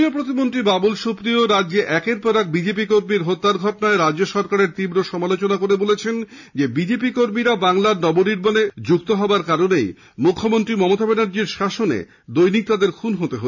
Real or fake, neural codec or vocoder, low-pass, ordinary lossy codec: real; none; 7.2 kHz; none